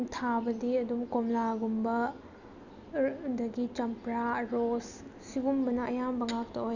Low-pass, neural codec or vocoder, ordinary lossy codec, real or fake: 7.2 kHz; none; none; real